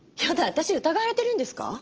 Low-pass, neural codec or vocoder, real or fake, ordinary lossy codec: 7.2 kHz; none; real; Opus, 16 kbps